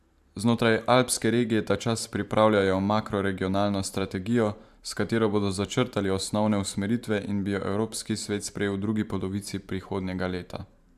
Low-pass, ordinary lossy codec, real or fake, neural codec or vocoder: 14.4 kHz; AAC, 96 kbps; fake; vocoder, 44.1 kHz, 128 mel bands every 512 samples, BigVGAN v2